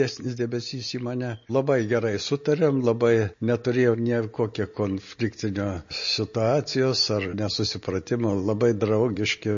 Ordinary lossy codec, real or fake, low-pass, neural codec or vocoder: MP3, 32 kbps; real; 7.2 kHz; none